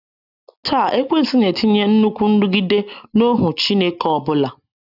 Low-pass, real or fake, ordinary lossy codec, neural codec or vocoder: 5.4 kHz; real; none; none